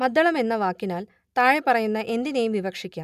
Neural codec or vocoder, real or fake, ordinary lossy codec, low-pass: none; real; none; 14.4 kHz